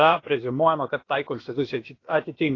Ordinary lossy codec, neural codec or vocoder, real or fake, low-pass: AAC, 32 kbps; codec, 16 kHz, about 1 kbps, DyCAST, with the encoder's durations; fake; 7.2 kHz